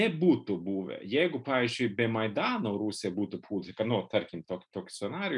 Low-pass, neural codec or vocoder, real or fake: 10.8 kHz; none; real